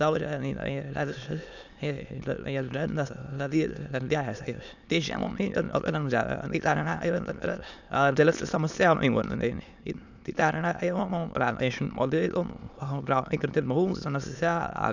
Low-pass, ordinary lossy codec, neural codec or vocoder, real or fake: 7.2 kHz; none; autoencoder, 22.05 kHz, a latent of 192 numbers a frame, VITS, trained on many speakers; fake